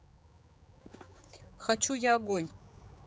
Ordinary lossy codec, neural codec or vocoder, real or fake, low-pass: none; codec, 16 kHz, 4 kbps, X-Codec, HuBERT features, trained on general audio; fake; none